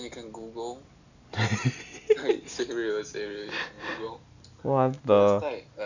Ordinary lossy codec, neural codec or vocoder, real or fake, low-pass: none; none; real; 7.2 kHz